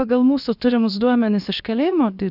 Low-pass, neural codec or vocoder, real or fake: 5.4 kHz; codec, 16 kHz, about 1 kbps, DyCAST, with the encoder's durations; fake